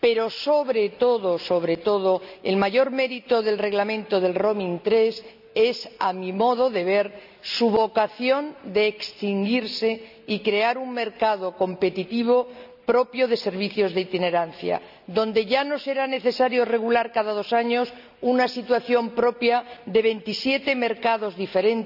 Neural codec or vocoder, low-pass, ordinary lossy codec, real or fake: none; 5.4 kHz; none; real